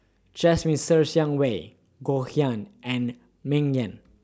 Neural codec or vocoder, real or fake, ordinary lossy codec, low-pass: none; real; none; none